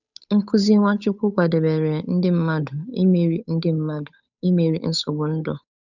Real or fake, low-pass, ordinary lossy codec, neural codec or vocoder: fake; 7.2 kHz; none; codec, 16 kHz, 8 kbps, FunCodec, trained on Chinese and English, 25 frames a second